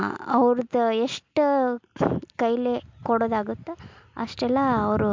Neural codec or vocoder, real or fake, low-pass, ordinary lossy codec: none; real; 7.2 kHz; MP3, 64 kbps